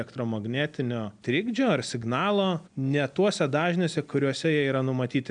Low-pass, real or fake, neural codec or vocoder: 9.9 kHz; real; none